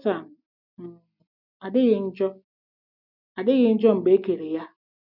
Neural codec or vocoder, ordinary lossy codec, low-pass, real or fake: none; none; 5.4 kHz; real